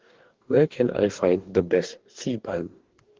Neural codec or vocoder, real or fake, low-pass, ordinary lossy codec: codec, 44.1 kHz, 2.6 kbps, DAC; fake; 7.2 kHz; Opus, 16 kbps